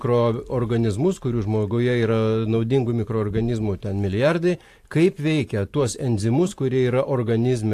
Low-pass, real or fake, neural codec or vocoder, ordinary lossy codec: 14.4 kHz; fake; vocoder, 44.1 kHz, 128 mel bands every 512 samples, BigVGAN v2; AAC, 64 kbps